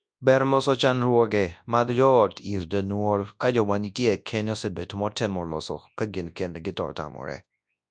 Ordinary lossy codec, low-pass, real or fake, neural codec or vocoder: MP3, 64 kbps; 9.9 kHz; fake; codec, 24 kHz, 0.9 kbps, WavTokenizer, large speech release